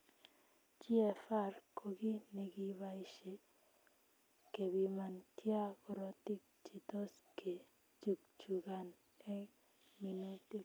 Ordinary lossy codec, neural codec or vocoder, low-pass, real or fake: none; none; none; real